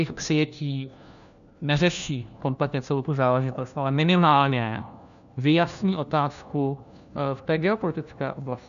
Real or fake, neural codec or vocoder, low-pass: fake; codec, 16 kHz, 1 kbps, FunCodec, trained on LibriTTS, 50 frames a second; 7.2 kHz